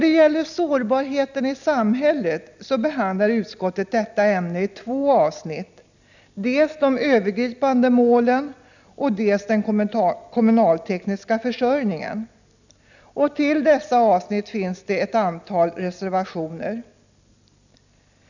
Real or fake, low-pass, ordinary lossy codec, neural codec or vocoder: real; 7.2 kHz; none; none